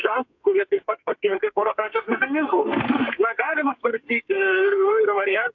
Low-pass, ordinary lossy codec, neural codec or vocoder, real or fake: 7.2 kHz; AAC, 48 kbps; codec, 32 kHz, 1.9 kbps, SNAC; fake